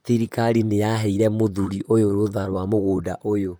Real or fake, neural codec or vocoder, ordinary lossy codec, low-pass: fake; vocoder, 44.1 kHz, 128 mel bands, Pupu-Vocoder; none; none